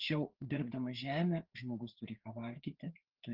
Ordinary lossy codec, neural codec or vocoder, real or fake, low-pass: Opus, 16 kbps; codec, 16 kHz, 4 kbps, FreqCodec, larger model; fake; 5.4 kHz